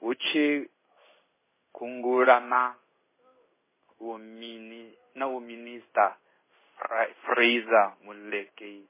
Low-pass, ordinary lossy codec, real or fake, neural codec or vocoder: 3.6 kHz; MP3, 16 kbps; fake; codec, 16 kHz in and 24 kHz out, 1 kbps, XY-Tokenizer